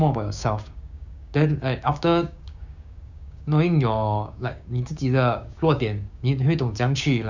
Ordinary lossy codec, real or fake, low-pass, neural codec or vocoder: none; fake; 7.2 kHz; codec, 16 kHz, 6 kbps, DAC